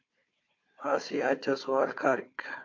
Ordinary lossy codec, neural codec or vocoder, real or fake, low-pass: MP3, 48 kbps; codec, 16 kHz, 4 kbps, FunCodec, trained on Chinese and English, 50 frames a second; fake; 7.2 kHz